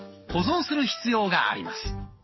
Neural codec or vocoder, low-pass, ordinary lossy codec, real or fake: codec, 44.1 kHz, 7.8 kbps, DAC; 7.2 kHz; MP3, 24 kbps; fake